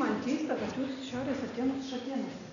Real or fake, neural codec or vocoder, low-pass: real; none; 7.2 kHz